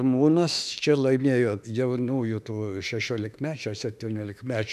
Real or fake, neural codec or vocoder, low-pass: fake; autoencoder, 48 kHz, 32 numbers a frame, DAC-VAE, trained on Japanese speech; 14.4 kHz